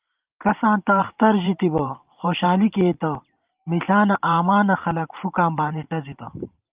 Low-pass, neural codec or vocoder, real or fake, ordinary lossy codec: 3.6 kHz; vocoder, 44.1 kHz, 128 mel bands every 512 samples, BigVGAN v2; fake; Opus, 24 kbps